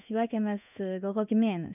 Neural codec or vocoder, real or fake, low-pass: codec, 16 kHz in and 24 kHz out, 1 kbps, XY-Tokenizer; fake; 3.6 kHz